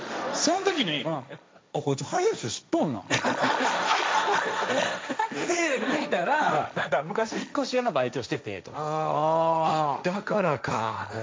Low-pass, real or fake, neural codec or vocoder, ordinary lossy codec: none; fake; codec, 16 kHz, 1.1 kbps, Voila-Tokenizer; none